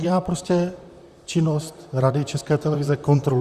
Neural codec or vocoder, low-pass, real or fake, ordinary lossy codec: vocoder, 44.1 kHz, 128 mel bands, Pupu-Vocoder; 14.4 kHz; fake; Opus, 64 kbps